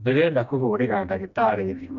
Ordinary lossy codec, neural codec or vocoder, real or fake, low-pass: none; codec, 16 kHz, 1 kbps, FreqCodec, smaller model; fake; 7.2 kHz